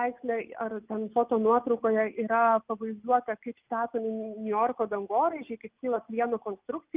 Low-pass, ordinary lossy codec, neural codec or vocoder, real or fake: 3.6 kHz; Opus, 24 kbps; none; real